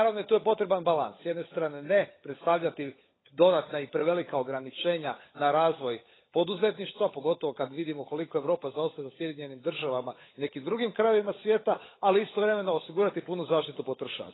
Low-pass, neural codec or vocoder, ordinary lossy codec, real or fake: 7.2 kHz; codec, 16 kHz, 16 kbps, FunCodec, trained on LibriTTS, 50 frames a second; AAC, 16 kbps; fake